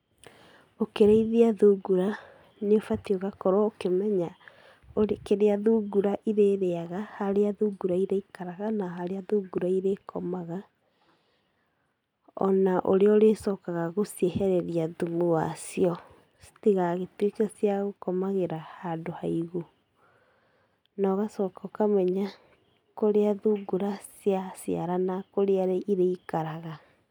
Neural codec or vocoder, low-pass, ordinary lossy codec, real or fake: none; 19.8 kHz; none; real